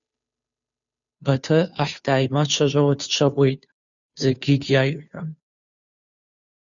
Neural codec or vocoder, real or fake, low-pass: codec, 16 kHz, 2 kbps, FunCodec, trained on Chinese and English, 25 frames a second; fake; 7.2 kHz